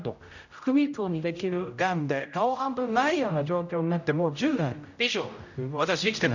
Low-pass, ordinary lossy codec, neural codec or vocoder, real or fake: 7.2 kHz; none; codec, 16 kHz, 0.5 kbps, X-Codec, HuBERT features, trained on general audio; fake